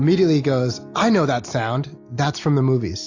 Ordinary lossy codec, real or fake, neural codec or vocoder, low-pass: AAC, 48 kbps; real; none; 7.2 kHz